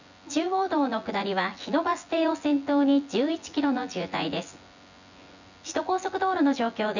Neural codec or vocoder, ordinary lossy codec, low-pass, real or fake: vocoder, 24 kHz, 100 mel bands, Vocos; none; 7.2 kHz; fake